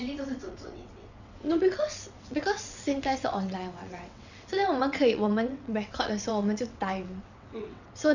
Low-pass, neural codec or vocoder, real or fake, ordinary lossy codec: 7.2 kHz; vocoder, 22.05 kHz, 80 mel bands, WaveNeXt; fake; none